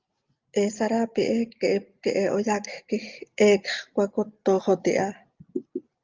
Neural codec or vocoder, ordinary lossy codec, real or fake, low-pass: none; Opus, 24 kbps; real; 7.2 kHz